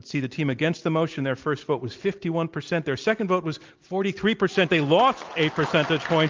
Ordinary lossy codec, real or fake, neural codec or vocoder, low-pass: Opus, 24 kbps; real; none; 7.2 kHz